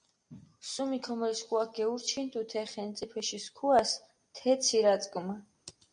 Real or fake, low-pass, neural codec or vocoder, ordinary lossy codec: real; 9.9 kHz; none; MP3, 96 kbps